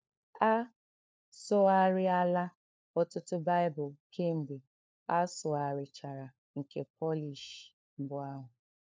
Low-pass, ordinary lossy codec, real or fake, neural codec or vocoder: none; none; fake; codec, 16 kHz, 4 kbps, FunCodec, trained on LibriTTS, 50 frames a second